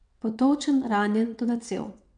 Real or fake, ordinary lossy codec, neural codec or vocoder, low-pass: fake; none; vocoder, 22.05 kHz, 80 mel bands, WaveNeXt; 9.9 kHz